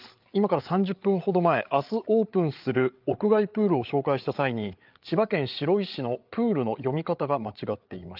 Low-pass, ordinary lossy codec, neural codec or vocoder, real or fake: 5.4 kHz; Opus, 24 kbps; codec, 16 kHz, 8 kbps, FreqCodec, larger model; fake